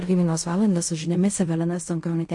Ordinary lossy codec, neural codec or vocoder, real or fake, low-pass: MP3, 48 kbps; codec, 16 kHz in and 24 kHz out, 0.4 kbps, LongCat-Audio-Codec, fine tuned four codebook decoder; fake; 10.8 kHz